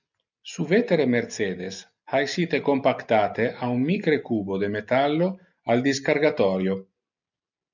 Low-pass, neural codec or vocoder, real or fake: 7.2 kHz; none; real